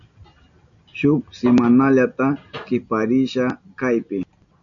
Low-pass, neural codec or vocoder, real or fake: 7.2 kHz; none; real